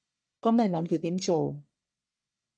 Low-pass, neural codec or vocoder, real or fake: 9.9 kHz; codec, 44.1 kHz, 1.7 kbps, Pupu-Codec; fake